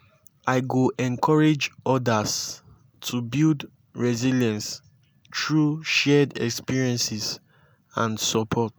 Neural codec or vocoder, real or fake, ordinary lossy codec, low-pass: none; real; none; none